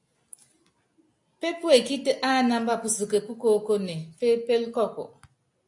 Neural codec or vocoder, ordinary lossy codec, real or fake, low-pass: none; AAC, 64 kbps; real; 10.8 kHz